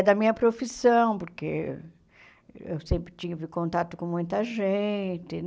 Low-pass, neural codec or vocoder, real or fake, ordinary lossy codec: none; none; real; none